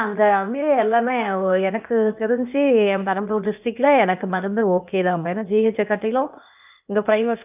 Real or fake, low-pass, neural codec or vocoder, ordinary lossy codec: fake; 3.6 kHz; codec, 16 kHz, 0.8 kbps, ZipCodec; none